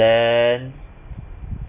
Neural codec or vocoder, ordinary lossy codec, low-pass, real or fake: none; none; 3.6 kHz; real